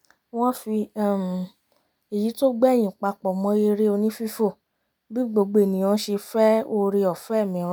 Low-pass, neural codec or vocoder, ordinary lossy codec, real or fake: none; none; none; real